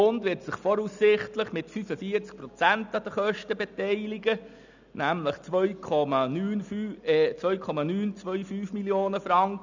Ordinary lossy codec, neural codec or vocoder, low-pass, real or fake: none; none; 7.2 kHz; real